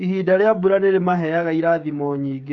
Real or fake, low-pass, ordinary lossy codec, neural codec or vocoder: fake; 7.2 kHz; none; codec, 16 kHz, 16 kbps, FreqCodec, smaller model